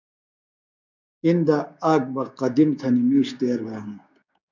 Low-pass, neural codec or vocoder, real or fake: 7.2 kHz; codec, 16 kHz, 6 kbps, DAC; fake